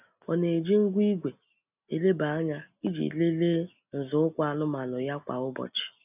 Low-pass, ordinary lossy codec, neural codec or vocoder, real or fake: 3.6 kHz; none; none; real